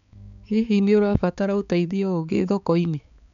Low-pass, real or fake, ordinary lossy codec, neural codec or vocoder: 7.2 kHz; fake; none; codec, 16 kHz, 4 kbps, X-Codec, HuBERT features, trained on balanced general audio